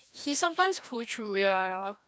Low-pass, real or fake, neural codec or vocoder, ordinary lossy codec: none; fake; codec, 16 kHz, 1 kbps, FreqCodec, larger model; none